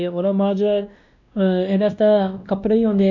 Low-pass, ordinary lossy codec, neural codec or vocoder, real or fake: 7.2 kHz; none; codec, 16 kHz, 1 kbps, X-Codec, WavLM features, trained on Multilingual LibriSpeech; fake